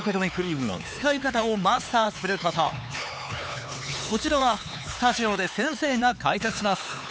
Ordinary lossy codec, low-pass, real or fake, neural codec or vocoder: none; none; fake; codec, 16 kHz, 4 kbps, X-Codec, HuBERT features, trained on LibriSpeech